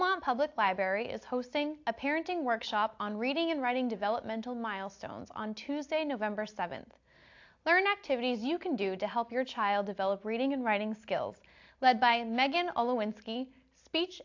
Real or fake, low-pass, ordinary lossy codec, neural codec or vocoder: real; 7.2 kHz; AAC, 48 kbps; none